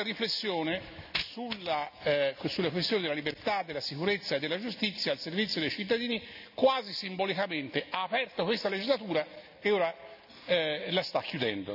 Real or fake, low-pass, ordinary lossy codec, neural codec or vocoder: real; 5.4 kHz; none; none